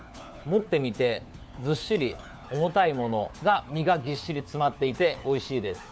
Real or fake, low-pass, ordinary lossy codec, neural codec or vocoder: fake; none; none; codec, 16 kHz, 4 kbps, FunCodec, trained on LibriTTS, 50 frames a second